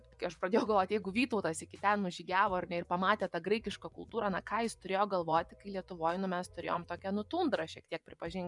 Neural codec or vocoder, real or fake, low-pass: vocoder, 24 kHz, 100 mel bands, Vocos; fake; 10.8 kHz